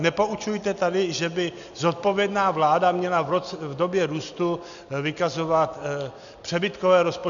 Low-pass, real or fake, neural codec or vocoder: 7.2 kHz; real; none